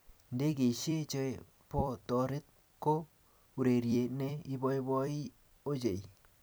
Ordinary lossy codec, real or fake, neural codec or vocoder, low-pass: none; fake; vocoder, 44.1 kHz, 128 mel bands every 256 samples, BigVGAN v2; none